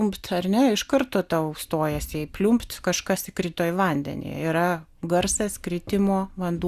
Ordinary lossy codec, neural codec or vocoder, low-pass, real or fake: Opus, 64 kbps; none; 14.4 kHz; real